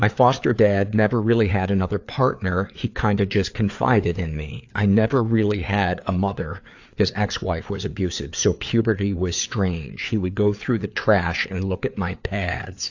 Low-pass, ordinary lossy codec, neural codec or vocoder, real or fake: 7.2 kHz; AAC, 48 kbps; codec, 16 kHz, 4 kbps, FreqCodec, larger model; fake